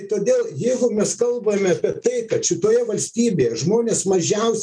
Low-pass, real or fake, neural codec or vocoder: 9.9 kHz; real; none